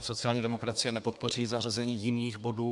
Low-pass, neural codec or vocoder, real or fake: 10.8 kHz; codec, 24 kHz, 1 kbps, SNAC; fake